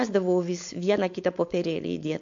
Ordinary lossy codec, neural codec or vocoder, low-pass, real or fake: MP3, 48 kbps; codec, 16 kHz, 4.8 kbps, FACodec; 7.2 kHz; fake